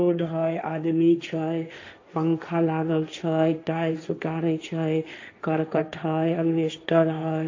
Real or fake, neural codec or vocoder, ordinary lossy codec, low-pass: fake; codec, 16 kHz, 1.1 kbps, Voila-Tokenizer; AAC, 48 kbps; 7.2 kHz